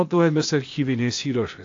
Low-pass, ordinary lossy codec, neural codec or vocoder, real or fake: 7.2 kHz; AAC, 48 kbps; codec, 16 kHz, 0.8 kbps, ZipCodec; fake